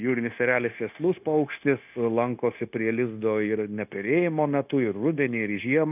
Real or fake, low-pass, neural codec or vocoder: fake; 3.6 kHz; codec, 16 kHz, 0.9 kbps, LongCat-Audio-Codec